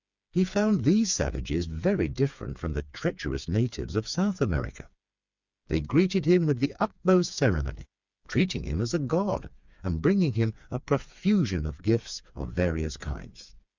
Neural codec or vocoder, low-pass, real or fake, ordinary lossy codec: codec, 16 kHz, 4 kbps, FreqCodec, smaller model; 7.2 kHz; fake; Opus, 64 kbps